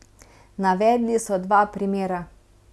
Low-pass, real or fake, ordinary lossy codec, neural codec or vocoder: none; real; none; none